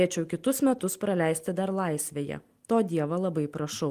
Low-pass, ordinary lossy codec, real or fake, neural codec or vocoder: 14.4 kHz; Opus, 24 kbps; real; none